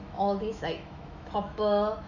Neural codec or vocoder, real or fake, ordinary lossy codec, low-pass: autoencoder, 48 kHz, 128 numbers a frame, DAC-VAE, trained on Japanese speech; fake; none; 7.2 kHz